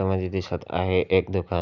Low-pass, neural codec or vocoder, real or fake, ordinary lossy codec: 7.2 kHz; none; real; none